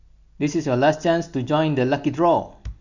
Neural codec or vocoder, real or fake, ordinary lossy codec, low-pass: none; real; none; 7.2 kHz